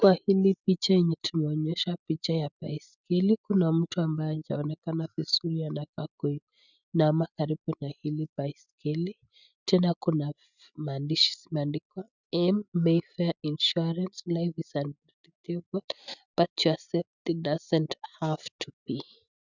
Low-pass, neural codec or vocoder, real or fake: 7.2 kHz; none; real